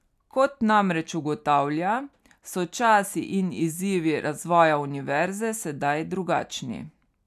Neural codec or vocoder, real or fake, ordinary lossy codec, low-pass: none; real; none; 14.4 kHz